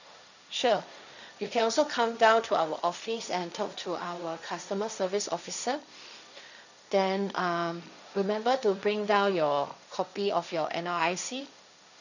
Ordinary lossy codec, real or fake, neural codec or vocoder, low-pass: none; fake; codec, 16 kHz, 1.1 kbps, Voila-Tokenizer; 7.2 kHz